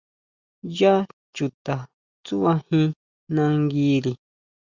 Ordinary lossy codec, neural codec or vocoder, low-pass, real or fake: Opus, 64 kbps; none; 7.2 kHz; real